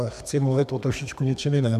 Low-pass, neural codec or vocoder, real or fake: 14.4 kHz; codec, 32 kHz, 1.9 kbps, SNAC; fake